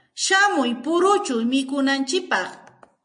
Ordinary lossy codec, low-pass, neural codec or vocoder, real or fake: MP3, 48 kbps; 9.9 kHz; none; real